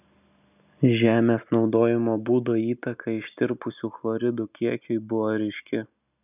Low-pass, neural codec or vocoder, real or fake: 3.6 kHz; none; real